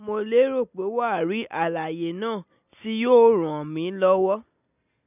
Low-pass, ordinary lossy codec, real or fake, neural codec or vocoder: 3.6 kHz; none; real; none